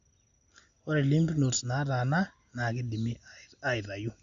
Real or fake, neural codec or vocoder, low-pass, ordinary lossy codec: real; none; 7.2 kHz; MP3, 64 kbps